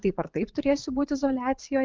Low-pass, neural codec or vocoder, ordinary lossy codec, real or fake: 7.2 kHz; vocoder, 44.1 kHz, 80 mel bands, Vocos; Opus, 32 kbps; fake